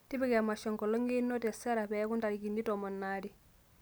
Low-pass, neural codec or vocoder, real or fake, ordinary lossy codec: none; none; real; none